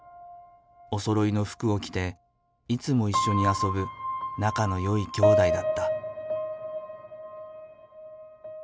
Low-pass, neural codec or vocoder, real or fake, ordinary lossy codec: none; none; real; none